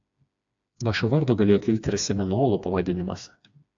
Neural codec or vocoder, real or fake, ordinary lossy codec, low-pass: codec, 16 kHz, 2 kbps, FreqCodec, smaller model; fake; AAC, 64 kbps; 7.2 kHz